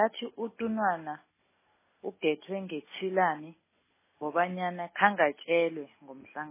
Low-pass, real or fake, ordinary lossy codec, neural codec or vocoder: 3.6 kHz; real; MP3, 16 kbps; none